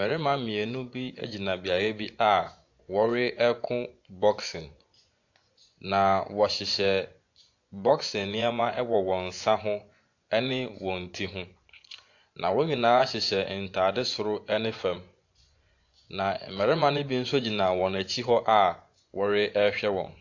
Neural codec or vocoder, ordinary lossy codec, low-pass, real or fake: vocoder, 24 kHz, 100 mel bands, Vocos; AAC, 48 kbps; 7.2 kHz; fake